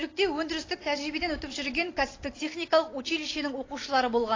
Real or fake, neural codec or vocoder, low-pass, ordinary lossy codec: real; none; 7.2 kHz; AAC, 32 kbps